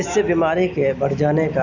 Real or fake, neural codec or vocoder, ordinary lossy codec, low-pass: real; none; none; 7.2 kHz